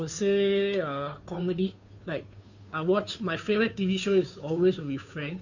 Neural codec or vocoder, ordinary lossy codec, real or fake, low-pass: codec, 16 kHz, 2 kbps, FunCodec, trained on Chinese and English, 25 frames a second; none; fake; 7.2 kHz